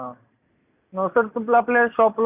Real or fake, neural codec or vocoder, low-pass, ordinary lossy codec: real; none; 3.6 kHz; none